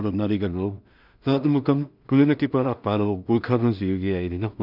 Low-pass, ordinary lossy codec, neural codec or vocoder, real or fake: 5.4 kHz; none; codec, 16 kHz in and 24 kHz out, 0.4 kbps, LongCat-Audio-Codec, two codebook decoder; fake